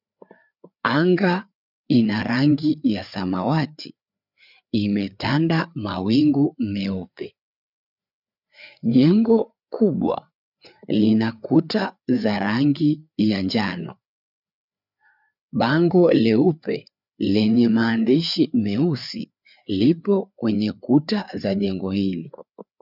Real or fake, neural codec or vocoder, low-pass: fake; codec, 16 kHz, 4 kbps, FreqCodec, larger model; 5.4 kHz